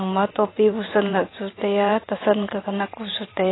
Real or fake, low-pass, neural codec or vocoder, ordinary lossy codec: fake; 7.2 kHz; vocoder, 44.1 kHz, 128 mel bands every 512 samples, BigVGAN v2; AAC, 16 kbps